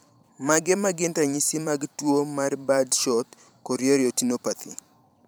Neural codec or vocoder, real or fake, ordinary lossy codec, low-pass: vocoder, 44.1 kHz, 128 mel bands every 512 samples, BigVGAN v2; fake; none; none